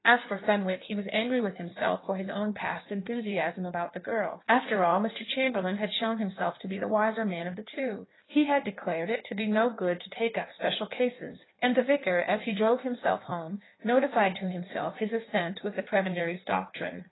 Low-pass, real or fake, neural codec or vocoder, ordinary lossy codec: 7.2 kHz; fake; codec, 16 kHz in and 24 kHz out, 1.1 kbps, FireRedTTS-2 codec; AAC, 16 kbps